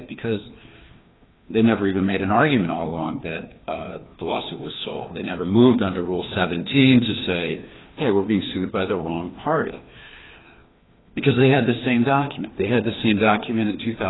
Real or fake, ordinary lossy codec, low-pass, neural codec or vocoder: fake; AAC, 16 kbps; 7.2 kHz; codec, 16 kHz, 2 kbps, FreqCodec, larger model